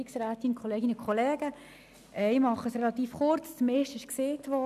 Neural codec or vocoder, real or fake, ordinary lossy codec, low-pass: none; real; none; 14.4 kHz